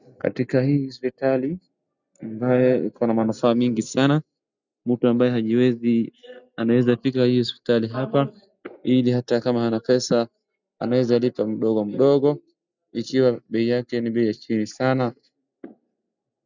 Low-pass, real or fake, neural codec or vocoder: 7.2 kHz; real; none